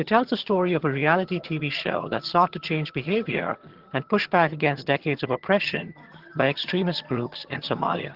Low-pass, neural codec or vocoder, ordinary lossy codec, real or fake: 5.4 kHz; vocoder, 22.05 kHz, 80 mel bands, HiFi-GAN; Opus, 16 kbps; fake